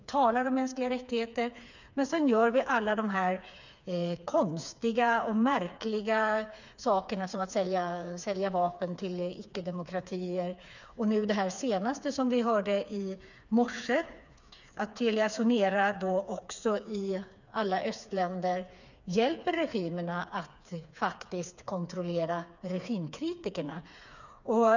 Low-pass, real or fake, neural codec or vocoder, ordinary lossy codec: 7.2 kHz; fake; codec, 16 kHz, 4 kbps, FreqCodec, smaller model; none